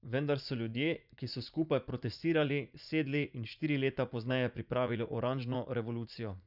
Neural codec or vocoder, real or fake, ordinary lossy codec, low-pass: vocoder, 44.1 kHz, 80 mel bands, Vocos; fake; none; 5.4 kHz